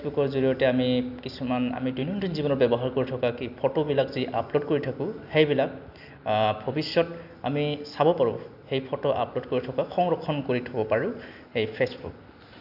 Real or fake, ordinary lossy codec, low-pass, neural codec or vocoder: real; none; 5.4 kHz; none